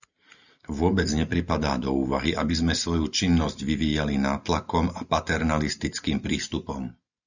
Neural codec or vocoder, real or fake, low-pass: none; real; 7.2 kHz